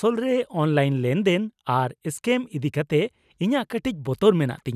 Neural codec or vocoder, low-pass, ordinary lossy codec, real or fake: none; 14.4 kHz; none; real